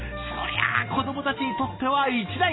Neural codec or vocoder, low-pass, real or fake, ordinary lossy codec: none; 7.2 kHz; real; AAC, 16 kbps